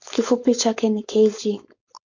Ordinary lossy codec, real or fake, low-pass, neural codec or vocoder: MP3, 48 kbps; fake; 7.2 kHz; codec, 16 kHz, 4.8 kbps, FACodec